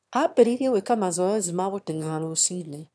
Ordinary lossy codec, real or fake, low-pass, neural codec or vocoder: none; fake; none; autoencoder, 22.05 kHz, a latent of 192 numbers a frame, VITS, trained on one speaker